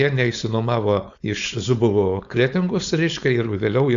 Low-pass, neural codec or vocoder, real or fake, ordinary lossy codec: 7.2 kHz; codec, 16 kHz, 4.8 kbps, FACodec; fake; Opus, 64 kbps